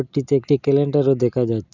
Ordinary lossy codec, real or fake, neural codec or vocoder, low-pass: none; real; none; 7.2 kHz